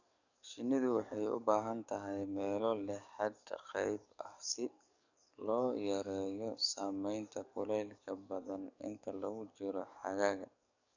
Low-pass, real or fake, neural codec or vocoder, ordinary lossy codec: 7.2 kHz; fake; codec, 44.1 kHz, 7.8 kbps, DAC; none